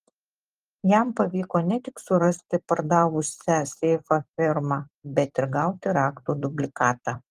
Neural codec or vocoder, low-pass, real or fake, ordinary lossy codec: vocoder, 44.1 kHz, 128 mel bands every 256 samples, BigVGAN v2; 14.4 kHz; fake; Opus, 24 kbps